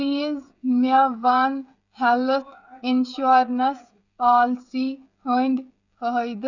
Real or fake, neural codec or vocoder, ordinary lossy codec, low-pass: fake; codec, 16 kHz, 16 kbps, FreqCodec, smaller model; none; 7.2 kHz